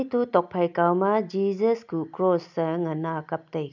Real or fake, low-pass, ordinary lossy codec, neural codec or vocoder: real; 7.2 kHz; none; none